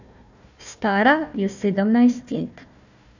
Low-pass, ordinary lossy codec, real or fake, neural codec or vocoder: 7.2 kHz; none; fake; codec, 16 kHz, 1 kbps, FunCodec, trained on Chinese and English, 50 frames a second